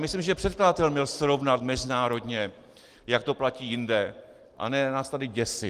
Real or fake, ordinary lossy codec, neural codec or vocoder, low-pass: real; Opus, 24 kbps; none; 14.4 kHz